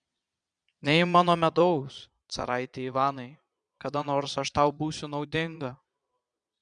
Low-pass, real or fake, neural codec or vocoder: 9.9 kHz; fake; vocoder, 22.05 kHz, 80 mel bands, Vocos